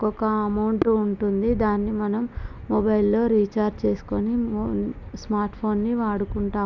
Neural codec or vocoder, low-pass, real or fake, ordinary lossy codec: none; 7.2 kHz; real; none